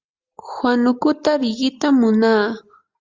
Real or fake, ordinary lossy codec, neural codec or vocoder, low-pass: real; Opus, 32 kbps; none; 7.2 kHz